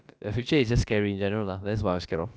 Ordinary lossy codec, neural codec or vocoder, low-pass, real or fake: none; codec, 16 kHz, about 1 kbps, DyCAST, with the encoder's durations; none; fake